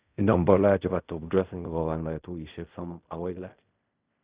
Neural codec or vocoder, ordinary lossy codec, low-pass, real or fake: codec, 16 kHz in and 24 kHz out, 0.4 kbps, LongCat-Audio-Codec, fine tuned four codebook decoder; Opus, 64 kbps; 3.6 kHz; fake